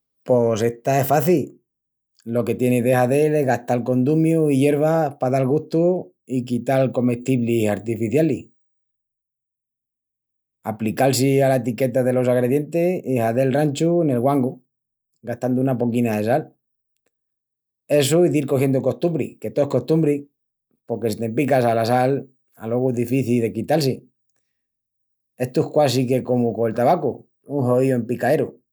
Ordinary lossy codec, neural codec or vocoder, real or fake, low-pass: none; none; real; none